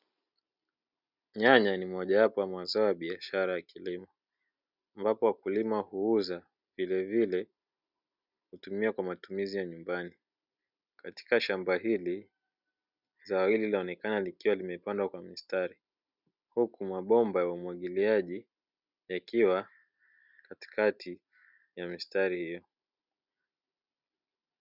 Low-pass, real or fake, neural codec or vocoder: 5.4 kHz; real; none